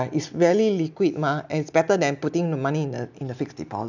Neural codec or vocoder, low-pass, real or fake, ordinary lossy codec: none; 7.2 kHz; real; none